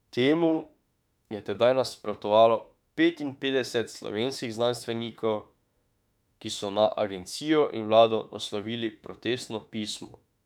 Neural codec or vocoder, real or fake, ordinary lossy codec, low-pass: autoencoder, 48 kHz, 32 numbers a frame, DAC-VAE, trained on Japanese speech; fake; none; 19.8 kHz